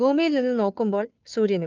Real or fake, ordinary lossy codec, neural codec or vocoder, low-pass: fake; Opus, 24 kbps; codec, 16 kHz, 2 kbps, FunCodec, trained on Chinese and English, 25 frames a second; 7.2 kHz